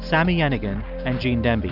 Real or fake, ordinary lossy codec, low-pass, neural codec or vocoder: real; AAC, 48 kbps; 5.4 kHz; none